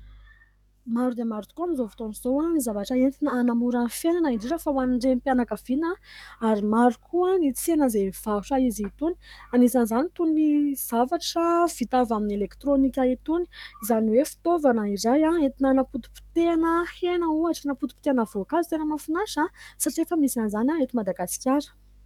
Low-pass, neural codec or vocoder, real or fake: 19.8 kHz; codec, 44.1 kHz, 7.8 kbps, DAC; fake